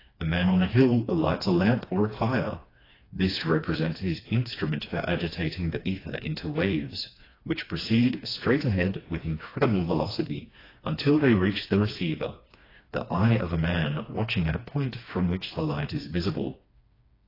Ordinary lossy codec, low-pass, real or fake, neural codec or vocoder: AAC, 24 kbps; 5.4 kHz; fake; codec, 16 kHz, 2 kbps, FreqCodec, smaller model